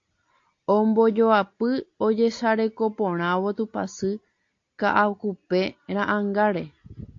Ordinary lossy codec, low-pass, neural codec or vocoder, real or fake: AAC, 48 kbps; 7.2 kHz; none; real